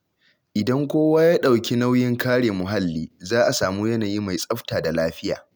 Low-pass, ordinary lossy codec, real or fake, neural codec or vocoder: none; none; real; none